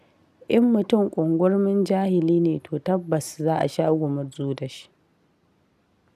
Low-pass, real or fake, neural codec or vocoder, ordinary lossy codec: 14.4 kHz; real; none; none